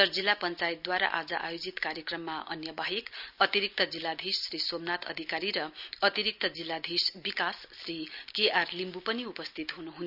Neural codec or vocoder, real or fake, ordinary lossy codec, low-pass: none; real; none; 5.4 kHz